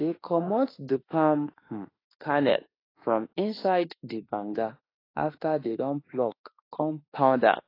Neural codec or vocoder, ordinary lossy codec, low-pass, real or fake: autoencoder, 48 kHz, 32 numbers a frame, DAC-VAE, trained on Japanese speech; AAC, 24 kbps; 5.4 kHz; fake